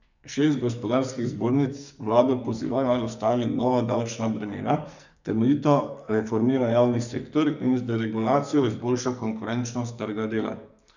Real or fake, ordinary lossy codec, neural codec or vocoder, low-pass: fake; none; codec, 44.1 kHz, 2.6 kbps, SNAC; 7.2 kHz